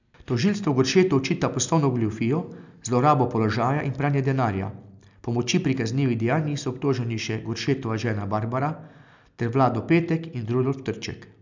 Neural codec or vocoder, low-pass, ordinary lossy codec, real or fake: none; 7.2 kHz; none; real